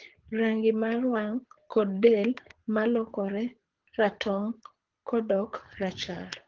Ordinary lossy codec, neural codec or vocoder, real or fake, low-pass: Opus, 16 kbps; codec, 24 kHz, 6 kbps, HILCodec; fake; 7.2 kHz